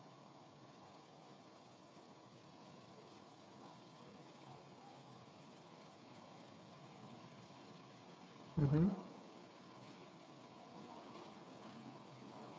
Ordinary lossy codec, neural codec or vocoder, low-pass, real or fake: none; codec, 16 kHz, 8 kbps, FreqCodec, smaller model; none; fake